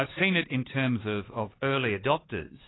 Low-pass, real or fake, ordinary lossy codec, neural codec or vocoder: 7.2 kHz; fake; AAC, 16 kbps; codec, 16 kHz, 4 kbps, X-Codec, HuBERT features, trained on LibriSpeech